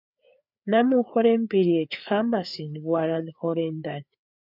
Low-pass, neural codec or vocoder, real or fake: 5.4 kHz; codec, 16 kHz, 4 kbps, FreqCodec, larger model; fake